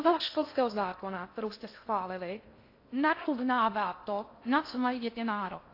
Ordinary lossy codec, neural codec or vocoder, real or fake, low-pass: AAC, 32 kbps; codec, 16 kHz in and 24 kHz out, 0.6 kbps, FocalCodec, streaming, 4096 codes; fake; 5.4 kHz